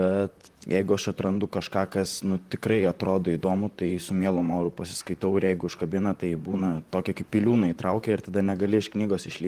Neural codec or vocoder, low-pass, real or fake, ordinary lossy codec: vocoder, 44.1 kHz, 128 mel bands, Pupu-Vocoder; 14.4 kHz; fake; Opus, 32 kbps